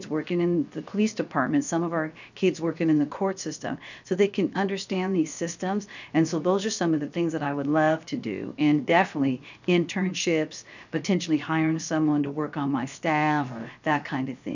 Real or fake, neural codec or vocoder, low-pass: fake; codec, 16 kHz, 0.7 kbps, FocalCodec; 7.2 kHz